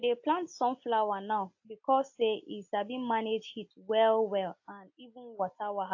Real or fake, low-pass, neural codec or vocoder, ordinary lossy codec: real; 7.2 kHz; none; AAC, 48 kbps